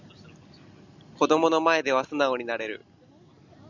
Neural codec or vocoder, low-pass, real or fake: none; 7.2 kHz; real